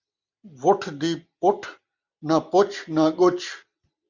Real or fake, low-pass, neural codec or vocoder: real; 7.2 kHz; none